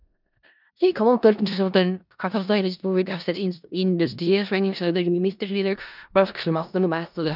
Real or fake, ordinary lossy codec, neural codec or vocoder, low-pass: fake; none; codec, 16 kHz in and 24 kHz out, 0.4 kbps, LongCat-Audio-Codec, four codebook decoder; 5.4 kHz